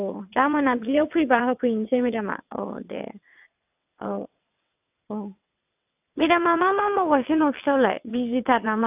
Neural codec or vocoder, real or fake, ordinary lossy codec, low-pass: vocoder, 22.05 kHz, 80 mel bands, WaveNeXt; fake; AAC, 32 kbps; 3.6 kHz